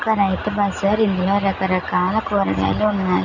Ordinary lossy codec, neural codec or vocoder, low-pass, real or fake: Opus, 64 kbps; none; 7.2 kHz; real